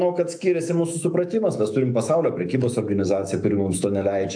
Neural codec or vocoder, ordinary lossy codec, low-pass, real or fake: autoencoder, 48 kHz, 128 numbers a frame, DAC-VAE, trained on Japanese speech; AAC, 64 kbps; 9.9 kHz; fake